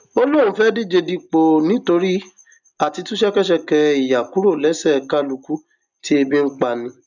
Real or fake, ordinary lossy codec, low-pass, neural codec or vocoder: real; none; 7.2 kHz; none